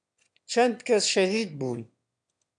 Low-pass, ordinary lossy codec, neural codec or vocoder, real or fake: 9.9 kHz; MP3, 96 kbps; autoencoder, 22.05 kHz, a latent of 192 numbers a frame, VITS, trained on one speaker; fake